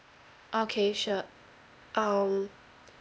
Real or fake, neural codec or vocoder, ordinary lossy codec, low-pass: fake; codec, 16 kHz, 0.8 kbps, ZipCodec; none; none